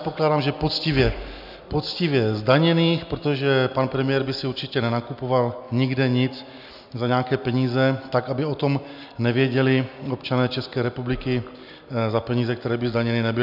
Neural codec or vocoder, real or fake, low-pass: none; real; 5.4 kHz